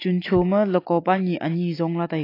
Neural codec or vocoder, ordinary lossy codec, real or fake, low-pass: none; AAC, 32 kbps; real; 5.4 kHz